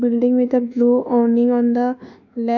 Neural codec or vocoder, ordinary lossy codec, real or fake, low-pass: codec, 24 kHz, 1.2 kbps, DualCodec; none; fake; 7.2 kHz